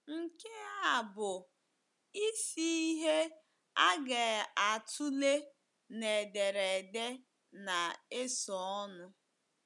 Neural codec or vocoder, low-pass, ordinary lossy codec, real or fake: none; 10.8 kHz; none; real